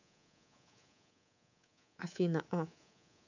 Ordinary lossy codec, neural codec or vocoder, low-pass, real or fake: none; codec, 24 kHz, 3.1 kbps, DualCodec; 7.2 kHz; fake